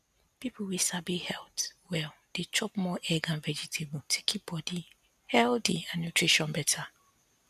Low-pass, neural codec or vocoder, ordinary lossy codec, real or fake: 14.4 kHz; none; none; real